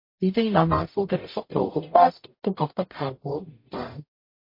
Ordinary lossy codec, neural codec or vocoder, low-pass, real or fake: MP3, 32 kbps; codec, 44.1 kHz, 0.9 kbps, DAC; 5.4 kHz; fake